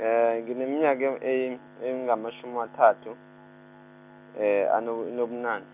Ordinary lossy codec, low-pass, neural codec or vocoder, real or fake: none; 3.6 kHz; none; real